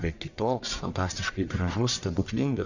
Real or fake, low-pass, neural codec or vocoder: fake; 7.2 kHz; codec, 44.1 kHz, 1.7 kbps, Pupu-Codec